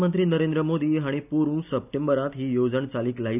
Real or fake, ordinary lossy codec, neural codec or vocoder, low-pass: real; none; none; 3.6 kHz